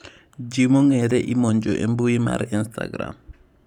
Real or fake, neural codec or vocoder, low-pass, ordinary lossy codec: fake; vocoder, 44.1 kHz, 128 mel bands every 512 samples, BigVGAN v2; 19.8 kHz; none